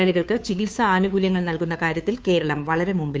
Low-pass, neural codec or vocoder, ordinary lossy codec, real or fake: none; codec, 16 kHz, 2 kbps, FunCodec, trained on Chinese and English, 25 frames a second; none; fake